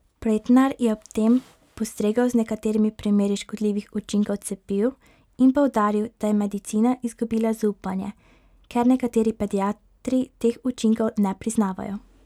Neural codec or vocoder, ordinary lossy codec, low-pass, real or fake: none; none; 19.8 kHz; real